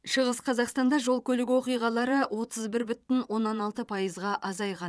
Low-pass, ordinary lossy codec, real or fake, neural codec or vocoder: none; none; fake; vocoder, 22.05 kHz, 80 mel bands, Vocos